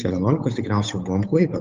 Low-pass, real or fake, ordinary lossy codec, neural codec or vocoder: 7.2 kHz; fake; Opus, 24 kbps; codec, 16 kHz, 8 kbps, FunCodec, trained on Chinese and English, 25 frames a second